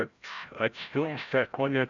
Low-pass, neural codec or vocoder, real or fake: 7.2 kHz; codec, 16 kHz, 0.5 kbps, FreqCodec, larger model; fake